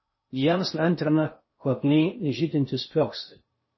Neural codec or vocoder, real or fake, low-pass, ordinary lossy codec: codec, 16 kHz in and 24 kHz out, 0.6 kbps, FocalCodec, streaming, 4096 codes; fake; 7.2 kHz; MP3, 24 kbps